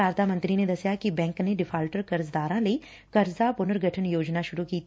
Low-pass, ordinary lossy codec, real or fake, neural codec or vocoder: none; none; real; none